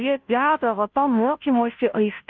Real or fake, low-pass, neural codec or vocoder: fake; 7.2 kHz; codec, 16 kHz, 0.5 kbps, FunCodec, trained on Chinese and English, 25 frames a second